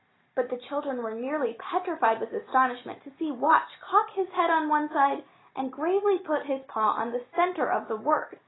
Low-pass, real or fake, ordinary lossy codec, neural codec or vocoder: 7.2 kHz; real; AAC, 16 kbps; none